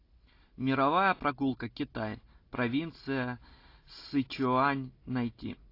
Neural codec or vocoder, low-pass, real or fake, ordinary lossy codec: none; 5.4 kHz; real; AAC, 32 kbps